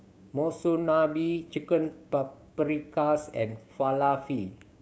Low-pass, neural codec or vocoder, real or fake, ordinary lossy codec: none; codec, 16 kHz, 6 kbps, DAC; fake; none